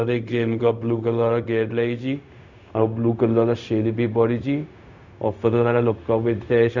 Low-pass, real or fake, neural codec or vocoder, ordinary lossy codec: 7.2 kHz; fake; codec, 16 kHz, 0.4 kbps, LongCat-Audio-Codec; none